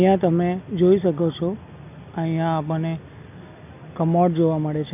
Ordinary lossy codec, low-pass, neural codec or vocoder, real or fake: AAC, 32 kbps; 3.6 kHz; none; real